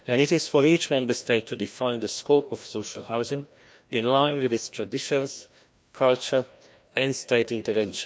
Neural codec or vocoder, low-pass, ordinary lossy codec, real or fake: codec, 16 kHz, 1 kbps, FreqCodec, larger model; none; none; fake